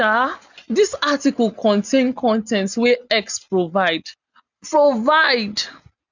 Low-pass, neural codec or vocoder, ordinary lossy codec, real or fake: 7.2 kHz; none; none; real